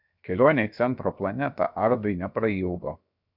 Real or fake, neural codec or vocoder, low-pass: fake; codec, 16 kHz, 0.7 kbps, FocalCodec; 5.4 kHz